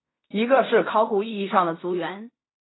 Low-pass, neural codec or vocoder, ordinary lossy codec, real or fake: 7.2 kHz; codec, 16 kHz in and 24 kHz out, 0.4 kbps, LongCat-Audio-Codec, fine tuned four codebook decoder; AAC, 16 kbps; fake